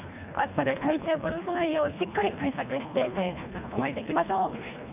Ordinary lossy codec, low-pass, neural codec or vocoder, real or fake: none; 3.6 kHz; codec, 24 kHz, 1.5 kbps, HILCodec; fake